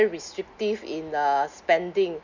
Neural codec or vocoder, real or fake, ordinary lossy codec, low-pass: none; real; none; 7.2 kHz